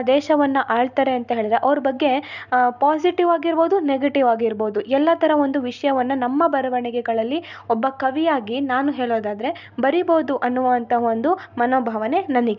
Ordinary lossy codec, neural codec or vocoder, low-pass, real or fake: none; none; 7.2 kHz; real